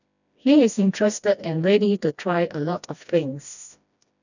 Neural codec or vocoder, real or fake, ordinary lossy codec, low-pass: codec, 16 kHz, 1 kbps, FreqCodec, smaller model; fake; none; 7.2 kHz